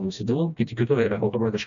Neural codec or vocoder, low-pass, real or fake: codec, 16 kHz, 1 kbps, FreqCodec, smaller model; 7.2 kHz; fake